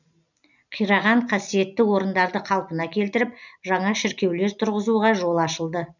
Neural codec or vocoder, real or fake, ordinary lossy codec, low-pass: none; real; none; 7.2 kHz